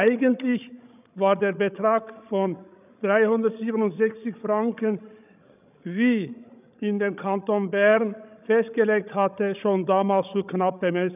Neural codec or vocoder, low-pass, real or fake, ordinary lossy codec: codec, 16 kHz, 16 kbps, FreqCodec, larger model; 3.6 kHz; fake; none